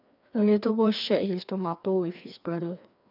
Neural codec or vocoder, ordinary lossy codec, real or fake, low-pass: codec, 16 kHz, 2 kbps, FreqCodec, larger model; none; fake; 5.4 kHz